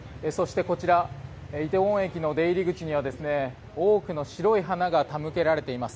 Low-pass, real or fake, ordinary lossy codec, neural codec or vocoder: none; real; none; none